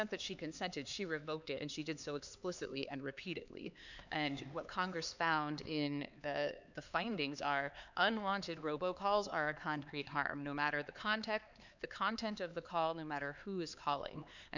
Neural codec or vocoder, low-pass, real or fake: codec, 16 kHz, 4 kbps, X-Codec, HuBERT features, trained on LibriSpeech; 7.2 kHz; fake